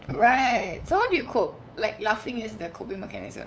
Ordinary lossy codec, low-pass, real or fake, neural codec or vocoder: none; none; fake; codec, 16 kHz, 8 kbps, FunCodec, trained on LibriTTS, 25 frames a second